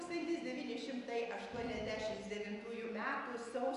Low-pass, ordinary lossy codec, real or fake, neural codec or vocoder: 10.8 kHz; AAC, 96 kbps; real; none